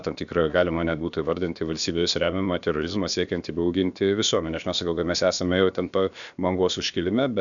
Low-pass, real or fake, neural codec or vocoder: 7.2 kHz; fake; codec, 16 kHz, about 1 kbps, DyCAST, with the encoder's durations